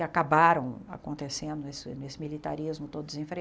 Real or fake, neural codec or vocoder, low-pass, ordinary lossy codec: real; none; none; none